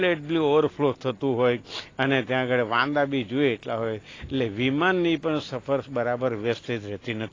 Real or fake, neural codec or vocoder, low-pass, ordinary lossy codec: real; none; 7.2 kHz; AAC, 32 kbps